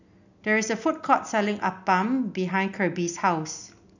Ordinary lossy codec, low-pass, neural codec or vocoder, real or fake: none; 7.2 kHz; none; real